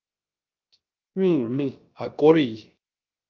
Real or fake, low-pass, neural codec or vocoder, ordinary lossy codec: fake; 7.2 kHz; codec, 16 kHz, 0.3 kbps, FocalCodec; Opus, 24 kbps